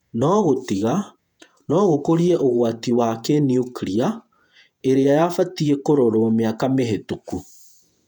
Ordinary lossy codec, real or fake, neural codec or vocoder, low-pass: none; fake; vocoder, 48 kHz, 128 mel bands, Vocos; 19.8 kHz